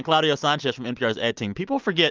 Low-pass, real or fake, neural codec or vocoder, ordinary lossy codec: 7.2 kHz; real; none; Opus, 24 kbps